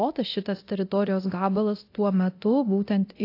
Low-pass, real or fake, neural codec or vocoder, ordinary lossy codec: 5.4 kHz; fake; codec, 24 kHz, 0.9 kbps, DualCodec; AAC, 32 kbps